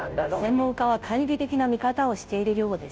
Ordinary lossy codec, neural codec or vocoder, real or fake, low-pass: none; codec, 16 kHz, 0.5 kbps, FunCodec, trained on Chinese and English, 25 frames a second; fake; none